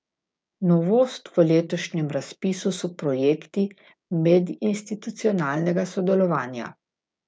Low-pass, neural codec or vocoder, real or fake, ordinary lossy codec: none; codec, 16 kHz, 6 kbps, DAC; fake; none